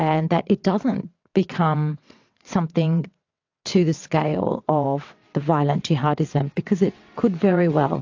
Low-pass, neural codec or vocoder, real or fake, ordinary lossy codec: 7.2 kHz; vocoder, 22.05 kHz, 80 mel bands, WaveNeXt; fake; AAC, 48 kbps